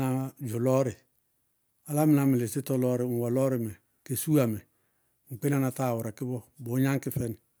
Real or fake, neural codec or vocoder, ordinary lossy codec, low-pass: real; none; none; none